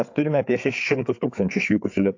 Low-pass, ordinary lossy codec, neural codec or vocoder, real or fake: 7.2 kHz; AAC, 48 kbps; codec, 16 kHz, 4 kbps, FreqCodec, larger model; fake